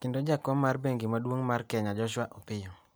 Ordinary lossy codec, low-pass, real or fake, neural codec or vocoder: none; none; real; none